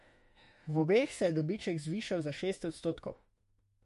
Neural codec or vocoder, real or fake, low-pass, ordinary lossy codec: autoencoder, 48 kHz, 32 numbers a frame, DAC-VAE, trained on Japanese speech; fake; 14.4 kHz; MP3, 48 kbps